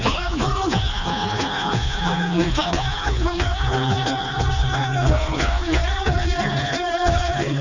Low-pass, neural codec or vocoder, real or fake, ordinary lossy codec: 7.2 kHz; codec, 16 kHz, 2 kbps, FreqCodec, smaller model; fake; none